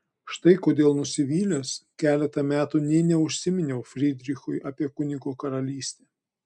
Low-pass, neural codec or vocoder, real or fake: 10.8 kHz; none; real